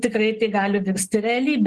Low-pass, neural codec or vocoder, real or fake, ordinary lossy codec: 10.8 kHz; none; real; Opus, 16 kbps